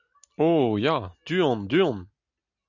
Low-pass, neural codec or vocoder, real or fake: 7.2 kHz; none; real